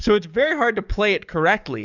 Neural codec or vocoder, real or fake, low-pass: codec, 44.1 kHz, 7.8 kbps, DAC; fake; 7.2 kHz